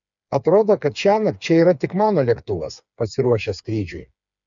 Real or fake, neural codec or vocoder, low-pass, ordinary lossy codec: fake; codec, 16 kHz, 4 kbps, FreqCodec, smaller model; 7.2 kHz; MP3, 96 kbps